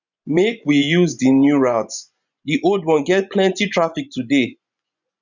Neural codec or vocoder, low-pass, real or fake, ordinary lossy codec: vocoder, 24 kHz, 100 mel bands, Vocos; 7.2 kHz; fake; none